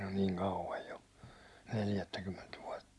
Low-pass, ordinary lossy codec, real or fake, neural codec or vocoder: none; none; real; none